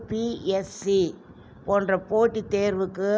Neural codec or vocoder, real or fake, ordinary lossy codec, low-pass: none; real; none; none